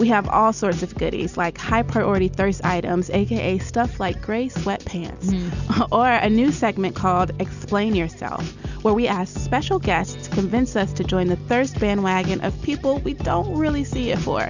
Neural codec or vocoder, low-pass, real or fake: none; 7.2 kHz; real